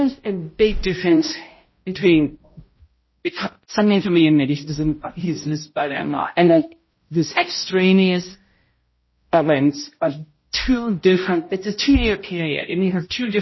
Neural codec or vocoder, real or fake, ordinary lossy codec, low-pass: codec, 16 kHz, 0.5 kbps, X-Codec, HuBERT features, trained on balanced general audio; fake; MP3, 24 kbps; 7.2 kHz